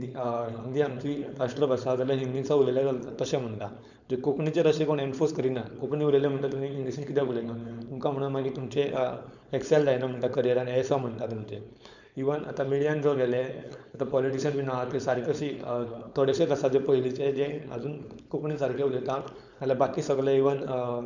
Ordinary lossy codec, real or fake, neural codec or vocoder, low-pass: none; fake; codec, 16 kHz, 4.8 kbps, FACodec; 7.2 kHz